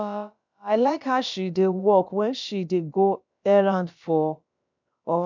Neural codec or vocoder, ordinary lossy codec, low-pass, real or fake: codec, 16 kHz, about 1 kbps, DyCAST, with the encoder's durations; MP3, 64 kbps; 7.2 kHz; fake